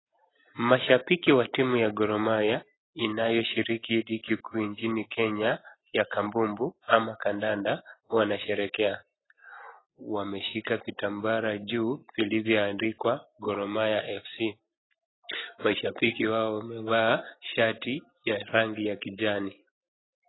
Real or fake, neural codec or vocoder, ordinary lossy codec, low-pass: real; none; AAC, 16 kbps; 7.2 kHz